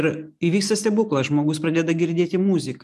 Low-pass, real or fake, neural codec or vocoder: 14.4 kHz; real; none